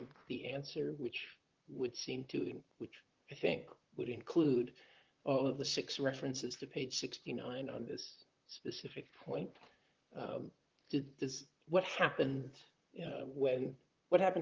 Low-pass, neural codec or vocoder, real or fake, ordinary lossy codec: 7.2 kHz; vocoder, 44.1 kHz, 128 mel bands, Pupu-Vocoder; fake; Opus, 32 kbps